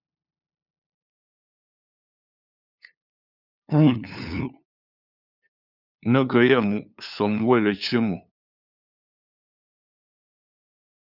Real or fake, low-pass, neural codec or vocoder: fake; 5.4 kHz; codec, 16 kHz, 2 kbps, FunCodec, trained on LibriTTS, 25 frames a second